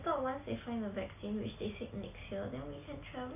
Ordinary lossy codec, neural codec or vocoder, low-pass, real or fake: MP3, 24 kbps; none; 3.6 kHz; real